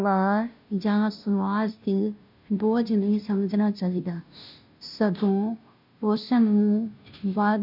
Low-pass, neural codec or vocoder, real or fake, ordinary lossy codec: 5.4 kHz; codec, 16 kHz, 0.5 kbps, FunCodec, trained on Chinese and English, 25 frames a second; fake; none